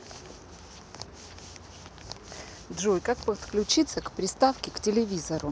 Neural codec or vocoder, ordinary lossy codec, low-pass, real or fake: none; none; none; real